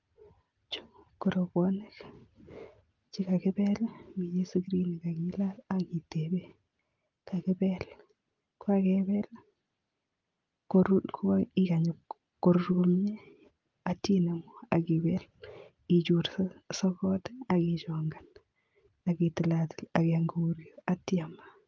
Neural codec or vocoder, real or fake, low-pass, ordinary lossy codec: none; real; 7.2 kHz; Opus, 24 kbps